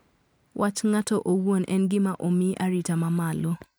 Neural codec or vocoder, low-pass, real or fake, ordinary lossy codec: none; none; real; none